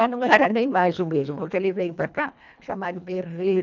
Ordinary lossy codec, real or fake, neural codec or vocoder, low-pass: none; fake; codec, 24 kHz, 1.5 kbps, HILCodec; 7.2 kHz